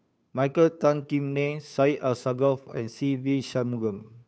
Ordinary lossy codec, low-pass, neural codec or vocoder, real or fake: none; none; codec, 16 kHz, 2 kbps, FunCodec, trained on Chinese and English, 25 frames a second; fake